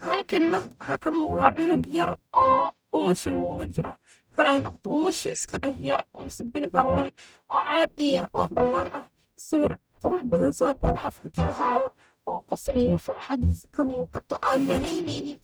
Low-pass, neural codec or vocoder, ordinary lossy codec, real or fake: none; codec, 44.1 kHz, 0.9 kbps, DAC; none; fake